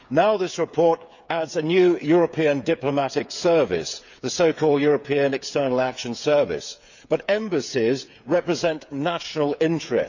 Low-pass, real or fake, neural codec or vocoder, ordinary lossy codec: 7.2 kHz; fake; codec, 16 kHz, 8 kbps, FreqCodec, smaller model; none